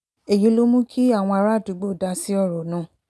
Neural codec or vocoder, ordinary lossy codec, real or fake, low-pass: none; none; real; none